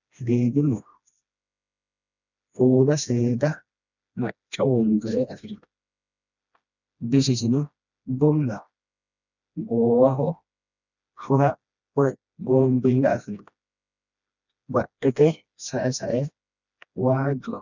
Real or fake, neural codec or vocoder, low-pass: fake; codec, 16 kHz, 1 kbps, FreqCodec, smaller model; 7.2 kHz